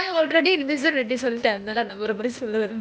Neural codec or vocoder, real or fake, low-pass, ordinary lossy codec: codec, 16 kHz, 0.8 kbps, ZipCodec; fake; none; none